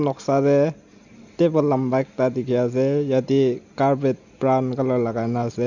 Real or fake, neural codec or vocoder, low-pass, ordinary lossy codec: real; none; 7.2 kHz; none